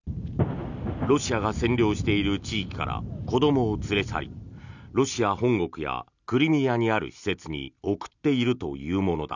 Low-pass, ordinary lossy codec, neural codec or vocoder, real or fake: 7.2 kHz; none; none; real